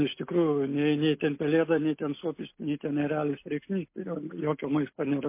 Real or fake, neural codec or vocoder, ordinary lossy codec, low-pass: real; none; MP3, 24 kbps; 3.6 kHz